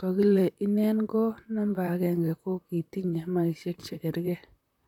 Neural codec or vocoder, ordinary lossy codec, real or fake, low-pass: vocoder, 44.1 kHz, 128 mel bands, Pupu-Vocoder; none; fake; 19.8 kHz